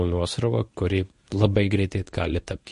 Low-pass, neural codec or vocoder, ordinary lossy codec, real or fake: 10.8 kHz; codec, 24 kHz, 0.9 kbps, WavTokenizer, medium speech release version 1; MP3, 48 kbps; fake